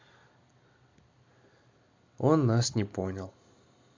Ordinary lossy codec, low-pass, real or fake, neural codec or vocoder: MP3, 48 kbps; 7.2 kHz; real; none